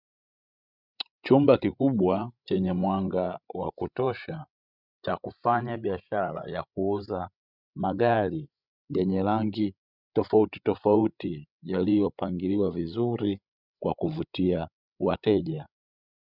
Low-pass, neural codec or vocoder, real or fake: 5.4 kHz; codec, 16 kHz, 16 kbps, FreqCodec, larger model; fake